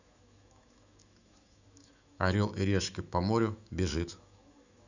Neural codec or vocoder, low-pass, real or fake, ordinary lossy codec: autoencoder, 48 kHz, 128 numbers a frame, DAC-VAE, trained on Japanese speech; 7.2 kHz; fake; none